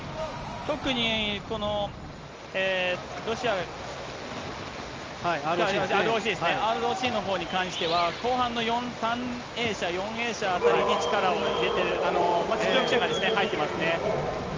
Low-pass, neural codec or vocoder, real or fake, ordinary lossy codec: 7.2 kHz; none; real; Opus, 24 kbps